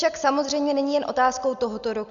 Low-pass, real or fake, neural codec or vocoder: 7.2 kHz; real; none